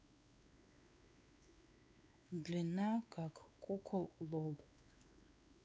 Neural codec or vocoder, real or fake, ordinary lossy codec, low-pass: codec, 16 kHz, 4 kbps, X-Codec, WavLM features, trained on Multilingual LibriSpeech; fake; none; none